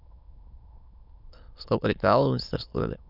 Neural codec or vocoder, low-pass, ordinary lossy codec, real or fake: autoencoder, 22.05 kHz, a latent of 192 numbers a frame, VITS, trained on many speakers; 5.4 kHz; none; fake